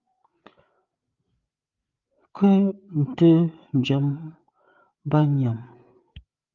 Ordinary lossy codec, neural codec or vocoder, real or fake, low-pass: Opus, 32 kbps; codec, 16 kHz, 8 kbps, FreqCodec, larger model; fake; 7.2 kHz